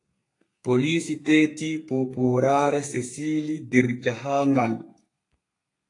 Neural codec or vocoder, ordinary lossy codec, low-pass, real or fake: codec, 32 kHz, 1.9 kbps, SNAC; AAC, 48 kbps; 10.8 kHz; fake